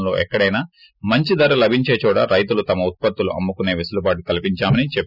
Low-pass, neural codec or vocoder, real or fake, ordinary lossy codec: 5.4 kHz; none; real; none